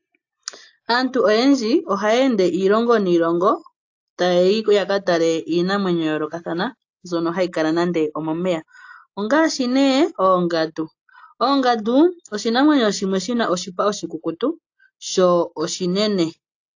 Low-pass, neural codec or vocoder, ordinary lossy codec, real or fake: 7.2 kHz; none; AAC, 48 kbps; real